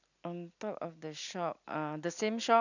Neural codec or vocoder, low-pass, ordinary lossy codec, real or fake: none; 7.2 kHz; none; real